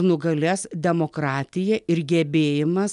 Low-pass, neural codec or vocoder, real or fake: 10.8 kHz; none; real